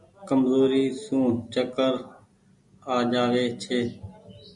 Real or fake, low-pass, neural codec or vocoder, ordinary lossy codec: real; 10.8 kHz; none; MP3, 96 kbps